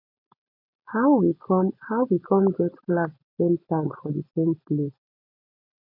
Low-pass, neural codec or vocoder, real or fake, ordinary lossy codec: 5.4 kHz; none; real; MP3, 48 kbps